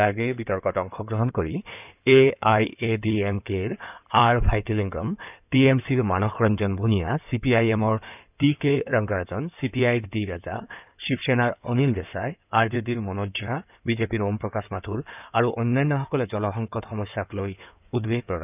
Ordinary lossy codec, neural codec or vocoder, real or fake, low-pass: none; codec, 16 kHz in and 24 kHz out, 2.2 kbps, FireRedTTS-2 codec; fake; 3.6 kHz